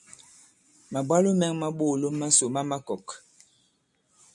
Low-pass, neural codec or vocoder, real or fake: 10.8 kHz; none; real